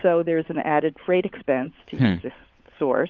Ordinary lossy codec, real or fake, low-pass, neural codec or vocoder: Opus, 24 kbps; fake; 7.2 kHz; codec, 16 kHz, 16 kbps, FunCodec, trained on LibriTTS, 50 frames a second